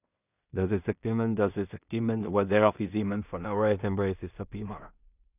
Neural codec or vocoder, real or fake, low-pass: codec, 16 kHz in and 24 kHz out, 0.4 kbps, LongCat-Audio-Codec, two codebook decoder; fake; 3.6 kHz